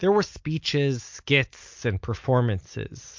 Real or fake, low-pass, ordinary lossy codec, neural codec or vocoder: real; 7.2 kHz; MP3, 48 kbps; none